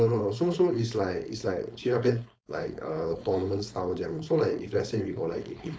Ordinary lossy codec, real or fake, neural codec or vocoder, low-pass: none; fake; codec, 16 kHz, 4.8 kbps, FACodec; none